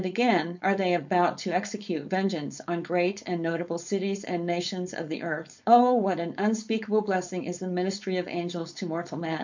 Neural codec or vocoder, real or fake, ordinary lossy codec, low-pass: codec, 16 kHz, 4.8 kbps, FACodec; fake; MP3, 64 kbps; 7.2 kHz